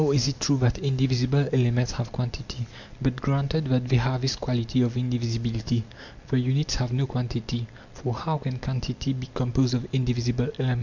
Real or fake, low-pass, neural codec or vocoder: real; 7.2 kHz; none